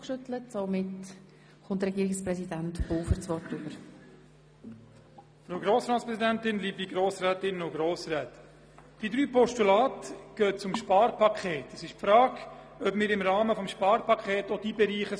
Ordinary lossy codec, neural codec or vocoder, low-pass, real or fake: none; none; none; real